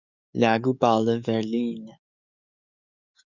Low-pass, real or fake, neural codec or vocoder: 7.2 kHz; fake; codec, 44.1 kHz, 7.8 kbps, DAC